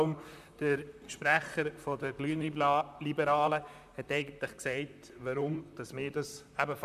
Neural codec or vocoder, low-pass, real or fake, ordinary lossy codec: vocoder, 44.1 kHz, 128 mel bands, Pupu-Vocoder; 14.4 kHz; fake; none